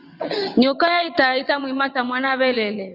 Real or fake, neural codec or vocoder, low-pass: fake; vocoder, 22.05 kHz, 80 mel bands, WaveNeXt; 5.4 kHz